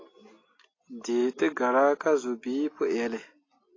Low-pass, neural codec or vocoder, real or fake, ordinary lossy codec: 7.2 kHz; none; real; AAC, 32 kbps